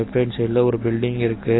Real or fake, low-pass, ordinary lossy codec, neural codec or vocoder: real; 7.2 kHz; AAC, 16 kbps; none